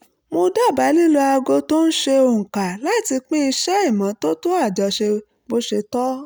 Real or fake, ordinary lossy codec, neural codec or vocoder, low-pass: real; none; none; none